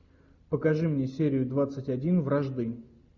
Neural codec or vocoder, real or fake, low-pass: none; real; 7.2 kHz